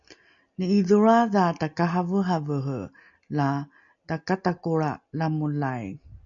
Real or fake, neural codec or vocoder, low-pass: real; none; 7.2 kHz